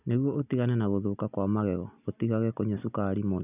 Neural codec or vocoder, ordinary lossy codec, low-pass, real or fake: none; none; 3.6 kHz; real